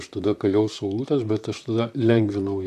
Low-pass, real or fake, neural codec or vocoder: 14.4 kHz; fake; vocoder, 44.1 kHz, 128 mel bands, Pupu-Vocoder